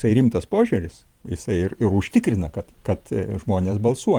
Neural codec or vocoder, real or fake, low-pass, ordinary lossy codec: vocoder, 44.1 kHz, 128 mel bands every 512 samples, BigVGAN v2; fake; 19.8 kHz; Opus, 24 kbps